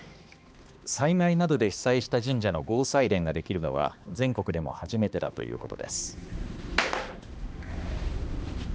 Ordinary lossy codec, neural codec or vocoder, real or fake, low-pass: none; codec, 16 kHz, 2 kbps, X-Codec, HuBERT features, trained on balanced general audio; fake; none